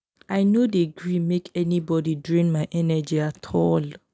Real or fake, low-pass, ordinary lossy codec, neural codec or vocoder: real; none; none; none